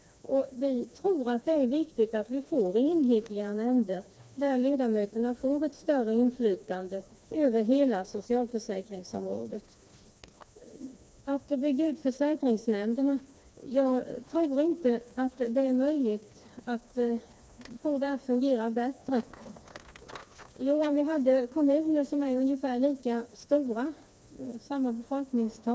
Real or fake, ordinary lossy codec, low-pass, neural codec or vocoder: fake; none; none; codec, 16 kHz, 2 kbps, FreqCodec, smaller model